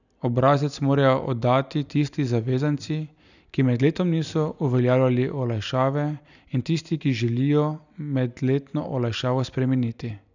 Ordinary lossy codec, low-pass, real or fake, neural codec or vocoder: none; 7.2 kHz; real; none